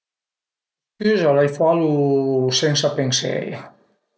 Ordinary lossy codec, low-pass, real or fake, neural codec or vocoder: none; none; real; none